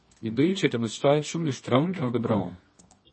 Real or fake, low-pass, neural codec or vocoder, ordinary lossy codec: fake; 9.9 kHz; codec, 24 kHz, 0.9 kbps, WavTokenizer, medium music audio release; MP3, 32 kbps